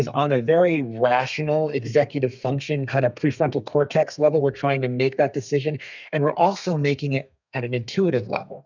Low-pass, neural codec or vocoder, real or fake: 7.2 kHz; codec, 32 kHz, 1.9 kbps, SNAC; fake